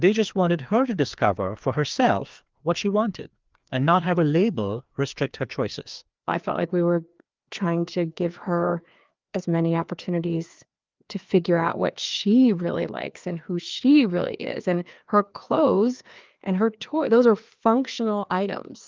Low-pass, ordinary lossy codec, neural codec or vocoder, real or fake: 7.2 kHz; Opus, 24 kbps; codec, 16 kHz, 2 kbps, FreqCodec, larger model; fake